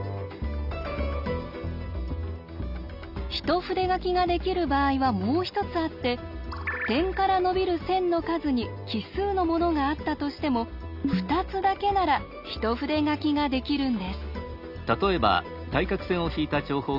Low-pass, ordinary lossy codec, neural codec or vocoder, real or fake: 5.4 kHz; none; none; real